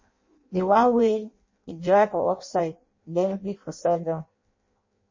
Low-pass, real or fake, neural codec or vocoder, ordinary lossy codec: 7.2 kHz; fake; codec, 16 kHz in and 24 kHz out, 0.6 kbps, FireRedTTS-2 codec; MP3, 32 kbps